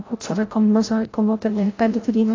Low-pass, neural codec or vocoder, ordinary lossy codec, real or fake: 7.2 kHz; codec, 16 kHz, 0.5 kbps, FreqCodec, larger model; AAC, 32 kbps; fake